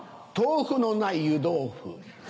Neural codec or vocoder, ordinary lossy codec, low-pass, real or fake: none; none; none; real